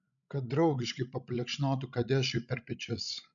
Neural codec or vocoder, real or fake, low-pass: codec, 16 kHz, 16 kbps, FreqCodec, larger model; fake; 7.2 kHz